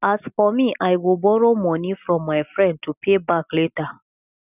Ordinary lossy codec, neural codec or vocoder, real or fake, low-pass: none; none; real; 3.6 kHz